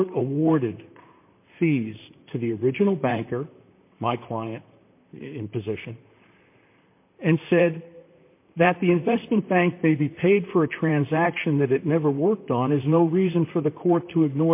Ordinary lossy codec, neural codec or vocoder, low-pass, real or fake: MP3, 24 kbps; vocoder, 44.1 kHz, 128 mel bands, Pupu-Vocoder; 3.6 kHz; fake